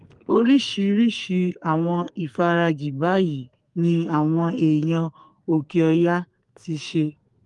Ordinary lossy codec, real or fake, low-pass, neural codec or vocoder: Opus, 32 kbps; fake; 10.8 kHz; codec, 32 kHz, 1.9 kbps, SNAC